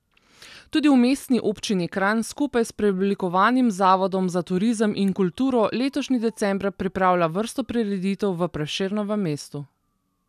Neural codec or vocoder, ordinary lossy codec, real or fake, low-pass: none; none; real; 14.4 kHz